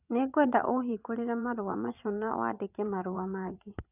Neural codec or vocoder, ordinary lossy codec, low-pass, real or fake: vocoder, 22.05 kHz, 80 mel bands, WaveNeXt; none; 3.6 kHz; fake